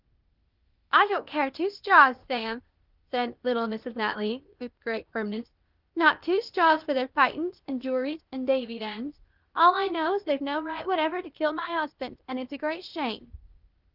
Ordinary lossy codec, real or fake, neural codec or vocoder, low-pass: Opus, 32 kbps; fake; codec, 16 kHz, 0.8 kbps, ZipCodec; 5.4 kHz